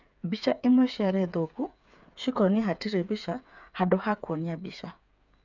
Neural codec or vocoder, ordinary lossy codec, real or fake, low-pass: codec, 16 kHz, 8 kbps, FreqCodec, smaller model; none; fake; 7.2 kHz